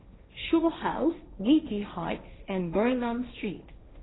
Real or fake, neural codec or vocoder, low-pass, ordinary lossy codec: fake; codec, 16 kHz, 1.1 kbps, Voila-Tokenizer; 7.2 kHz; AAC, 16 kbps